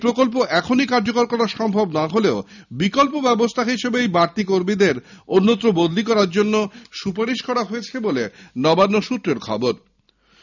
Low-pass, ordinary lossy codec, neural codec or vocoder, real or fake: 7.2 kHz; none; none; real